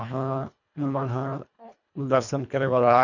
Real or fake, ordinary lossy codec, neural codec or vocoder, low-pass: fake; none; codec, 24 kHz, 1.5 kbps, HILCodec; 7.2 kHz